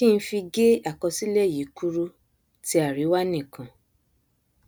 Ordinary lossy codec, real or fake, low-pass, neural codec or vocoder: none; real; none; none